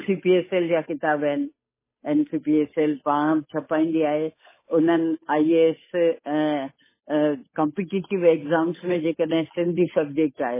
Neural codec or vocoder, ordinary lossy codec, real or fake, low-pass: codec, 16 kHz, 8 kbps, FunCodec, trained on Chinese and English, 25 frames a second; MP3, 16 kbps; fake; 3.6 kHz